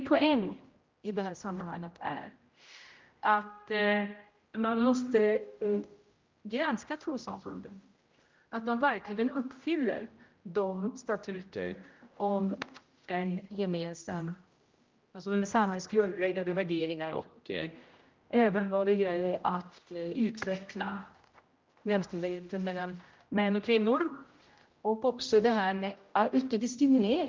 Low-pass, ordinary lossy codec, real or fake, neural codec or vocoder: 7.2 kHz; Opus, 24 kbps; fake; codec, 16 kHz, 0.5 kbps, X-Codec, HuBERT features, trained on general audio